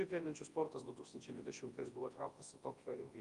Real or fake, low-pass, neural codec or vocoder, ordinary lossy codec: fake; 10.8 kHz; codec, 24 kHz, 0.9 kbps, WavTokenizer, large speech release; Opus, 32 kbps